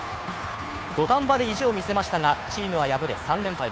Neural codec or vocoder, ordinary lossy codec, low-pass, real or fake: codec, 16 kHz, 2 kbps, FunCodec, trained on Chinese and English, 25 frames a second; none; none; fake